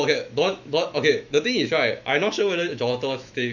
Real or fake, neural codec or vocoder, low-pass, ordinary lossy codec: fake; autoencoder, 48 kHz, 128 numbers a frame, DAC-VAE, trained on Japanese speech; 7.2 kHz; none